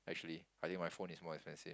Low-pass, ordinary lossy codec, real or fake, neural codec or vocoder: none; none; real; none